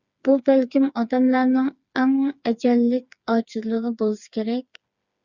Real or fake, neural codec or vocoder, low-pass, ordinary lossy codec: fake; codec, 16 kHz, 4 kbps, FreqCodec, smaller model; 7.2 kHz; Opus, 64 kbps